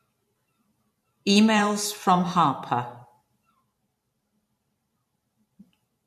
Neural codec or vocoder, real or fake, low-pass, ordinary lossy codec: vocoder, 44.1 kHz, 128 mel bands every 512 samples, BigVGAN v2; fake; 14.4 kHz; MP3, 64 kbps